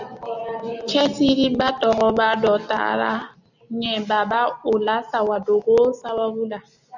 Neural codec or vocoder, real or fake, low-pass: none; real; 7.2 kHz